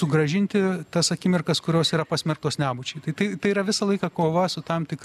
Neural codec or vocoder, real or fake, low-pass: none; real; 14.4 kHz